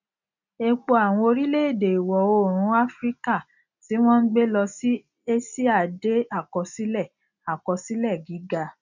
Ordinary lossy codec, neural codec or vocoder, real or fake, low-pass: none; none; real; 7.2 kHz